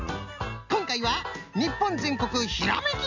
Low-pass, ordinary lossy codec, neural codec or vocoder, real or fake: 7.2 kHz; none; none; real